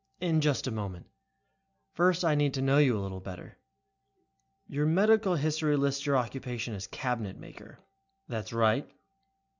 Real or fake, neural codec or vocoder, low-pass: real; none; 7.2 kHz